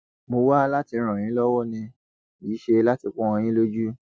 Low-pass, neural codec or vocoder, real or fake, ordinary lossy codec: none; none; real; none